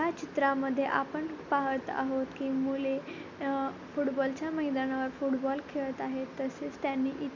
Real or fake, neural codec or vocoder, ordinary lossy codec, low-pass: real; none; MP3, 64 kbps; 7.2 kHz